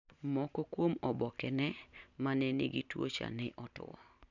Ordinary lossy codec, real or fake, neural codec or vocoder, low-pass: none; real; none; 7.2 kHz